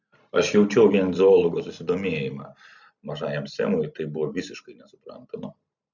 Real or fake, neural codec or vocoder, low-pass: real; none; 7.2 kHz